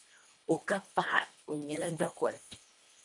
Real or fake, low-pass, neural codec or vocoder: fake; 10.8 kHz; codec, 24 kHz, 1.5 kbps, HILCodec